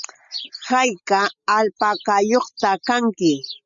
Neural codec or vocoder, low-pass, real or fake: none; 7.2 kHz; real